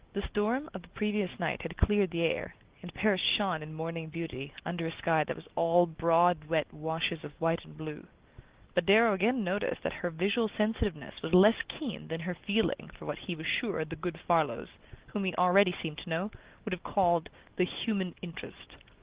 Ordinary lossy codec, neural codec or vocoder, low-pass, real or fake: Opus, 16 kbps; none; 3.6 kHz; real